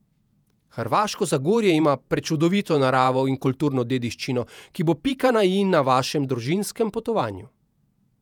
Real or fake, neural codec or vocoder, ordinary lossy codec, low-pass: fake; vocoder, 48 kHz, 128 mel bands, Vocos; none; 19.8 kHz